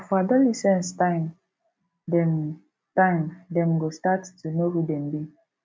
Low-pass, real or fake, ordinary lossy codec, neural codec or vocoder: none; real; none; none